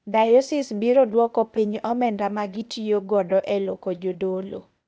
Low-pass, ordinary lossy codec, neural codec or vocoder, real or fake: none; none; codec, 16 kHz, 0.8 kbps, ZipCodec; fake